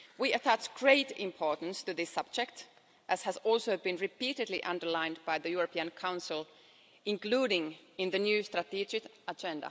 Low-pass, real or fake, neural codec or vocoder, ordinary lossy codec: none; real; none; none